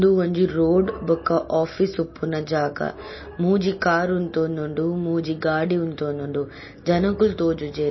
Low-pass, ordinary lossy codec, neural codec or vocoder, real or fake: 7.2 kHz; MP3, 24 kbps; none; real